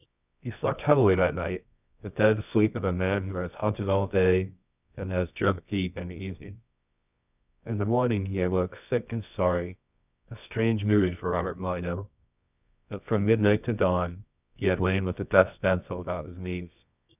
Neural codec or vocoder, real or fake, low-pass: codec, 24 kHz, 0.9 kbps, WavTokenizer, medium music audio release; fake; 3.6 kHz